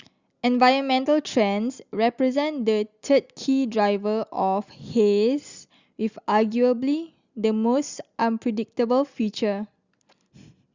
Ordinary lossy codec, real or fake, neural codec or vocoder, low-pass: Opus, 64 kbps; real; none; 7.2 kHz